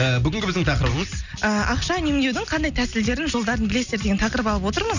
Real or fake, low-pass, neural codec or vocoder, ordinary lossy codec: real; 7.2 kHz; none; none